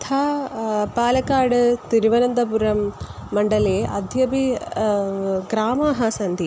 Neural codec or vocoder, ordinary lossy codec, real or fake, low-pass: none; none; real; none